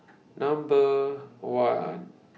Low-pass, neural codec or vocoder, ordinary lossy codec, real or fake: none; none; none; real